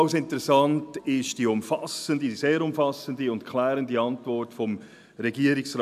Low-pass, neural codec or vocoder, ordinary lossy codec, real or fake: 14.4 kHz; none; none; real